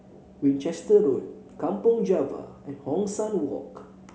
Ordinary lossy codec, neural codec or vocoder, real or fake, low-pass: none; none; real; none